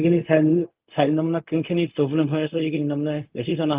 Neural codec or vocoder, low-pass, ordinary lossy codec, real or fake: codec, 16 kHz, 0.4 kbps, LongCat-Audio-Codec; 3.6 kHz; Opus, 24 kbps; fake